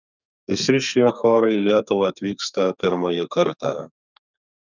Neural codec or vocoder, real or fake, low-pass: codec, 32 kHz, 1.9 kbps, SNAC; fake; 7.2 kHz